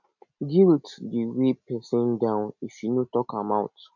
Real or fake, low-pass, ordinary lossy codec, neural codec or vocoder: real; 7.2 kHz; none; none